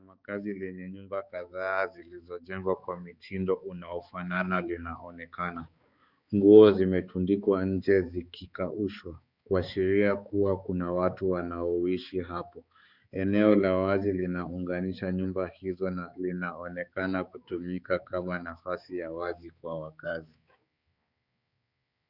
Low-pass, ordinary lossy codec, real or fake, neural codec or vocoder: 5.4 kHz; Opus, 64 kbps; fake; codec, 16 kHz, 4 kbps, X-Codec, HuBERT features, trained on balanced general audio